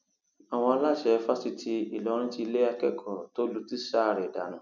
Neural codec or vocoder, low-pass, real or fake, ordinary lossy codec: none; 7.2 kHz; real; none